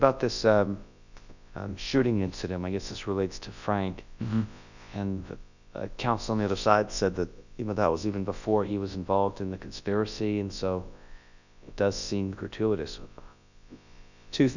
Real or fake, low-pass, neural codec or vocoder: fake; 7.2 kHz; codec, 24 kHz, 0.9 kbps, WavTokenizer, large speech release